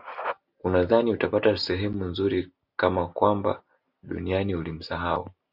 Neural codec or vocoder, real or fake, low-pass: none; real; 5.4 kHz